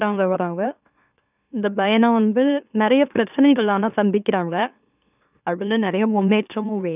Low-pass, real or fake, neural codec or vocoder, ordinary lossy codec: 3.6 kHz; fake; autoencoder, 44.1 kHz, a latent of 192 numbers a frame, MeloTTS; none